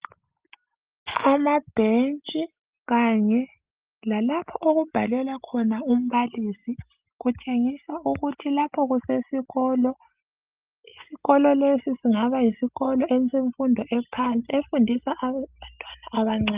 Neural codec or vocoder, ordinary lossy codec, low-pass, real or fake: none; Opus, 24 kbps; 3.6 kHz; real